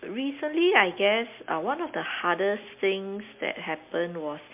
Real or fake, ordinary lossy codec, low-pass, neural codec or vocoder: real; MP3, 32 kbps; 3.6 kHz; none